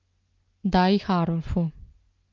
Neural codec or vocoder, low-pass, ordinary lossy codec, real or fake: none; 7.2 kHz; Opus, 32 kbps; real